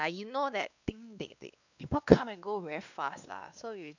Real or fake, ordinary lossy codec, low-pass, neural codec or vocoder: fake; none; 7.2 kHz; codec, 16 kHz, 2 kbps, X-Codec, WavLM features, trained on Multilingual LibriSpeech